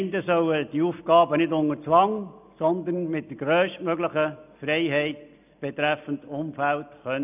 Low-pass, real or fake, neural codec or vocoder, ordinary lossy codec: 3.6 kHz; real; none; none